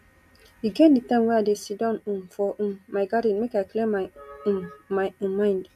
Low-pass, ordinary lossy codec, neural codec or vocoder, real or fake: 14.4 kHz; none; none; real